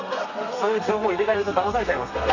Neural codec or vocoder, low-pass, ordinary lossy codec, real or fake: codec, 32 kHz, 1.9 kbps, SNAC; 7.2 kHz; none; fake